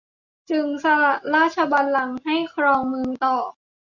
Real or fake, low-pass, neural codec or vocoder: real; 7.2 kHz; none